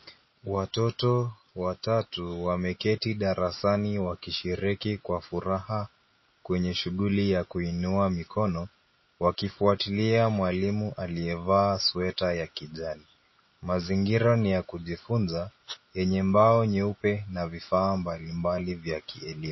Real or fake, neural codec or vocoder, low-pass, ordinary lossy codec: real; none; 7.2 kHz; MP3, 24 kbps